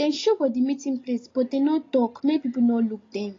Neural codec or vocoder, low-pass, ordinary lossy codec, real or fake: none; 7.2 kHz; AAC, 32 kbps; real